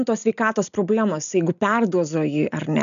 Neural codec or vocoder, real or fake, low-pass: none; real; 7.2 kHz